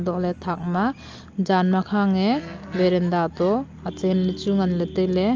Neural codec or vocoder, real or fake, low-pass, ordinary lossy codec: none; real; 7.2 kHz; Opus, 24 kbps